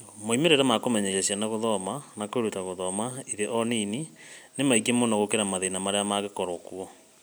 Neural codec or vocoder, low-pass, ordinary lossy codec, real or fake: none; none; none; real